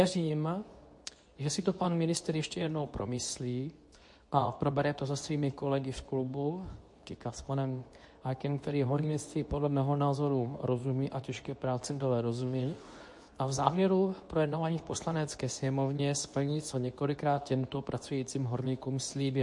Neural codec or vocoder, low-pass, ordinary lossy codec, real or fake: codec, 24 kHz, 0.9 kbps, WavTokenizer, medium speech release version 2; 10.8 kHz; MP3, 48 kbps; fake